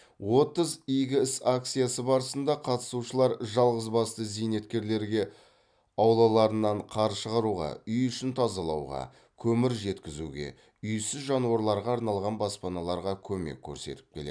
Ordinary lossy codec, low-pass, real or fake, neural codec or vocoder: none; 9.9 kHz; real; none